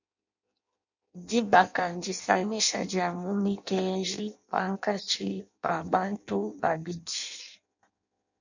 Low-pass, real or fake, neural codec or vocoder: 7.2 kHz; fake; codec, 16 kHz in and 24 kHz out, 0.6 kbps, FireRedTTS-2 codec